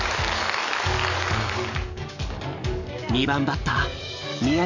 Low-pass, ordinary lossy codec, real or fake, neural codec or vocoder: 7.2 kHz; none; fake; codec, 44.1 kHz, 7.8 kbps, DAC